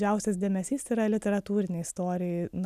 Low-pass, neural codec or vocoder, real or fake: 14.4 kHz; none; real